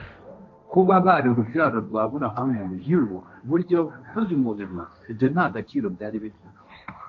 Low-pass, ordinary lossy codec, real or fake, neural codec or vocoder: 7.2 kHz; AAC, 48 kbps; fake; codec, 16 kHz, 1.1 kbps, Voila-Tokenizer